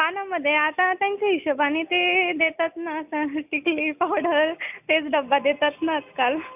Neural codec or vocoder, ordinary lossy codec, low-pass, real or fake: none; none; 3.6 kHz; real